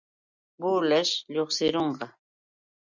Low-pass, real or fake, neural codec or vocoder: 7.2 kHz; real; none